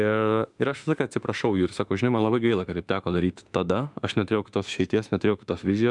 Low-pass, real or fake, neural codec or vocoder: 10.8 kHz; fake; autoencoder, 48 kHz, 32 numbers a frame, DAC-VAE, trained on Japanese speech